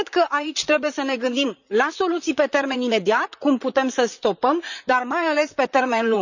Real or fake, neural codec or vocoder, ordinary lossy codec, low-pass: fake; vocoder, 44.1 kHz, 128 mel bands, Pupu-Vocoder; none; 7.2 kHz